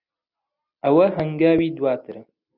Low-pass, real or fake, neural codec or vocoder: 5.4 kHz; real; none